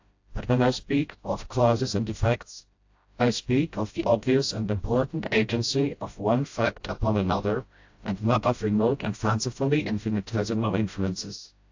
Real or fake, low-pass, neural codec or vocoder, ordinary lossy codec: fake; 7.2 kHz; codec, 16 kHz, 0.5 kbps, FreqCodec, smaller model; AAC, 48 kbps